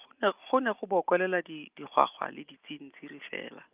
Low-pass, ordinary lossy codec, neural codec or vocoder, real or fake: 3.6 kHz; Opus, 24 kbps; none; real